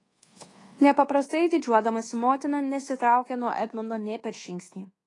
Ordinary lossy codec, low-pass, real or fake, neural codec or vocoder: AAC, 32 kbps; 10.8 kHz; fake; codec, 24 kHz, 1.2 kbps, DualCodec